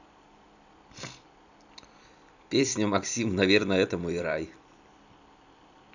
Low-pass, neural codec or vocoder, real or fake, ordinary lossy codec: 7.2 kHz; vocoder, 44.1 kHz, 128 mel bands every 256 samples, BigVGAN v2; fake; none